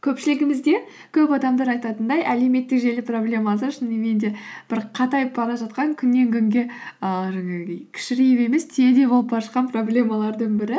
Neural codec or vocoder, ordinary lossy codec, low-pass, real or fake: none; none; none; real